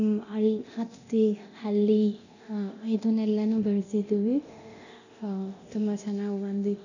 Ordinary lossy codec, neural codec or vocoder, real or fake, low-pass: none; codec, 24 kHz, 0.9 kbps, DualCodec; fake; 7.2 kHz